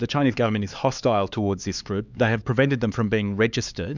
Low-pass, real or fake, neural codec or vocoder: 7.2 kHz; fake; codec, 16 kHz, 4 kbps, X-Codec, HuBERT features, trained on LibriSpeech